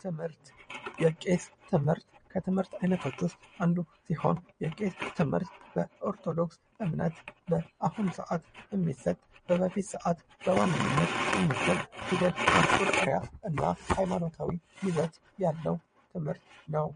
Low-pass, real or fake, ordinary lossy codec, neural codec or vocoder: 9.9 kHz; fake; MP3, 48 kbps; vocoder, 22.05 kHz, 80 mel bands, WaveNeXt